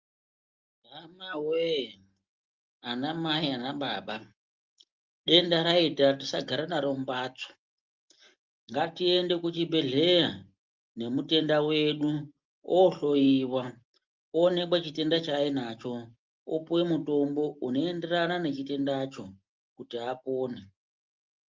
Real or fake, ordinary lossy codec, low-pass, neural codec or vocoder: real; Opus, 32 kbps; 7.2 kHz; none